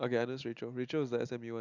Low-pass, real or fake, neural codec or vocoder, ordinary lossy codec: 7.2 kHz; real; none; none